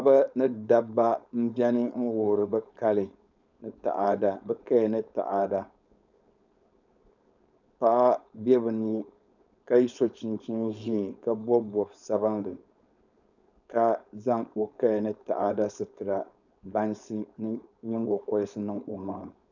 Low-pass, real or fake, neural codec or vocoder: 7.2 kHz; fake; codec, 16 kHz, 4.8 kbps, FACodec